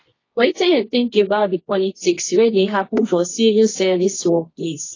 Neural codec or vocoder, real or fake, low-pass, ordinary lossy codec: codec, 24 kHz, 0.9 kbps, WavTokenizer, medium music audio release; fake; 7.2 kHz; AAC, 32 kbps